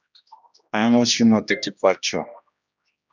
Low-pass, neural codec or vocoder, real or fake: 7.2 kHz; codec, 16 kHz, 1 kbps, X-Codec, HuBERT features, trained on general audio; fake